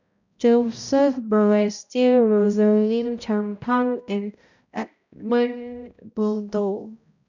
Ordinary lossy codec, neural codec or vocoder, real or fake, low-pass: none; codec, 16 kHz, 0.5 kbps, X-Codec, HuBERT features, trained on balanced general audio; fake; 7.2 kHz